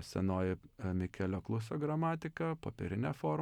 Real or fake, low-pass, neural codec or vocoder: real; 19.8 kHz; none